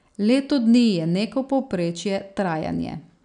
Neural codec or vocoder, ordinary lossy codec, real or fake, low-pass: none; none; real; 9.9 kHz